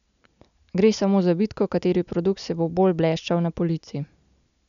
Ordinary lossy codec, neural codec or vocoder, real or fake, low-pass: none; none; real; 7.2 kHz